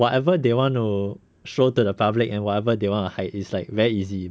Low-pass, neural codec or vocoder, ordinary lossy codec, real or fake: none; none; none; real